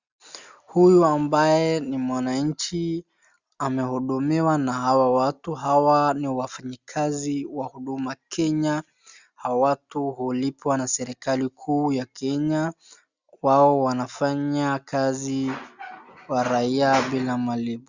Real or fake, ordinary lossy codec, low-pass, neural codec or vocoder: real; Opus, 64 kbps; 7.2 kHz; none